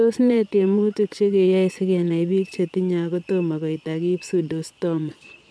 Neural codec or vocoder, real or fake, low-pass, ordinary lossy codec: vocoder, 22.05 kHz, 80 mel bands, Vocos; fake; none; none